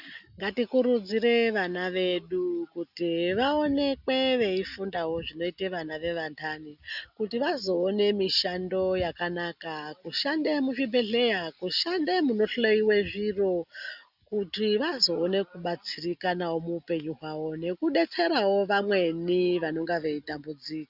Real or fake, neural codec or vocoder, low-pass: real; none; 5.4 kHz